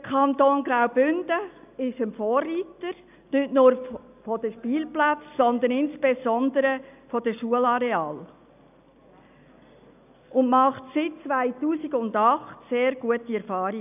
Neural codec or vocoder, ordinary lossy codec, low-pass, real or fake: none; none; 3.6 kHz; real